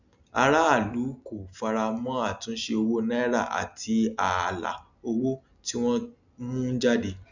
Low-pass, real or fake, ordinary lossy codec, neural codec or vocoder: 7.2 kHz; real; none; none